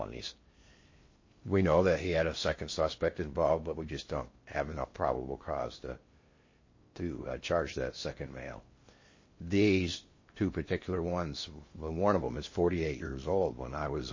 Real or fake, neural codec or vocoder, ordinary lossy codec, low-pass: fake; codec, 16 kHz in and 24 kHz out, 0.6 kbps, FocalCodec, streaming, 4096 codes; MP3, 32 kbps; 7.2 kHz